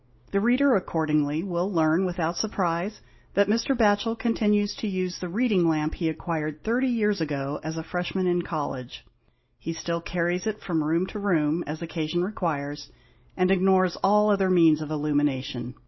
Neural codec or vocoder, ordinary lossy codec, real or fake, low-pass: none; MP3, 24 kbps; real; 7.2 kHz